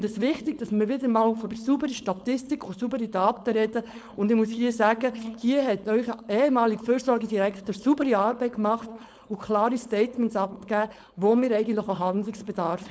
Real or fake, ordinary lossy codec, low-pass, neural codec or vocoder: fake; none; none; codec, 16 kHz, 4.8 kbps, FACodec